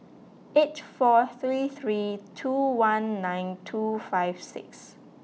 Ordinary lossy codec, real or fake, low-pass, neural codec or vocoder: none; real; none; none